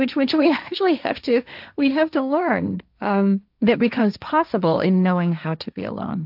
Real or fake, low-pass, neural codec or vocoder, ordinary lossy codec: fake; 5.4 kHz; codec, 16 kHz, 1.1 kbps, Voila-Tokenizer; AAC, 48 kbps